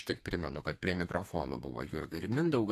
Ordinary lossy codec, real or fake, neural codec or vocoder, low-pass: AAC, 64 kbps; fake; codec, 32 kHz, 1.9 kbps, SNAC; 14.4 kHz